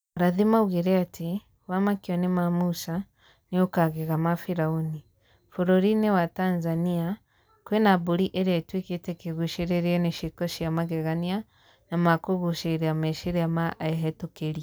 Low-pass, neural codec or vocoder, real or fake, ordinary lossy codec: none; none; real; none